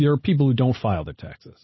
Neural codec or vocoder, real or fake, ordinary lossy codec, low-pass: none; real; MP3, 24 kbps; 7.2 kHz